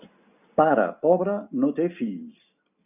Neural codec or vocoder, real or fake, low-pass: none; real; 3.6 kHz